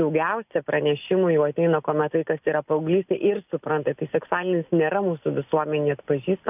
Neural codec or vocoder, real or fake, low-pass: none; real; 3.6 kHz